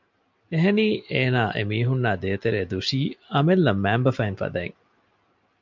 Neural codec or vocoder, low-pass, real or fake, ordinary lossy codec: none; 7.2 kHz; real; MP3, 64 kbps